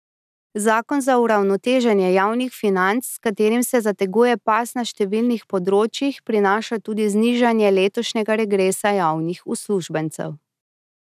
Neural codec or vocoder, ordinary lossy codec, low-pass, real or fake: none; none; 14.4 kHz; real